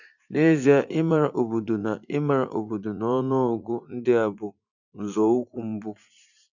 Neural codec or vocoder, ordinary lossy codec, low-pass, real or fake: vocoder, 44.1 kHz, 80 mel bands, Vocos; none; 7.2 kHz; fake